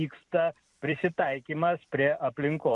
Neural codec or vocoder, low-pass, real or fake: vocoder, 44.1 kHz, 128 mel bands every 256 samples, BigVGAN v2; 10.8 kHz; fake